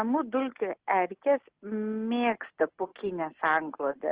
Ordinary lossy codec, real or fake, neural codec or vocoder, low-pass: Opus, 16 kbps; real; none; 3.6 kHz